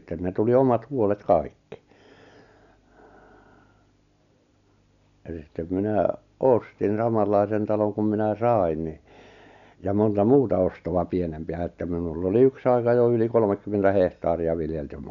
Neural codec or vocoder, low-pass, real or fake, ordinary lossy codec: none; 7.2 kHz; real; none